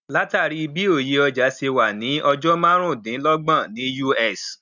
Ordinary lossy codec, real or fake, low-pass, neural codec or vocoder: none; real; 7.2 kHz; none